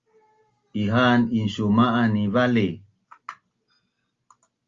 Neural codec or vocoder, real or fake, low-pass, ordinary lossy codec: none; real; 7.2 kHz; Opus, 32 kbps